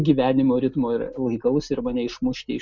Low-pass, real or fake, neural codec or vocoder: 7.2 kHz; real; none